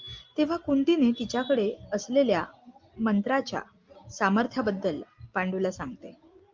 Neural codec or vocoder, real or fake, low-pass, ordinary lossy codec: none; real; 7.2 kHz; Opus, 24 kbps